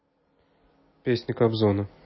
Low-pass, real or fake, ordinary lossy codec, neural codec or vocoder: 7.2 kHz; real; MP3, 24 kbps; none